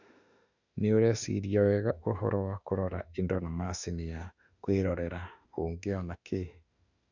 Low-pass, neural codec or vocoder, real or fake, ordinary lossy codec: 7.2 kHz; autoencoder, 48 kHz, 32 numbers a frame, DAC-VAE, trained on Japanese speech; fake; none